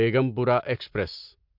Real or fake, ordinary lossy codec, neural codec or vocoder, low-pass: real; MP3, 48 kbps; none; 5.4 kHz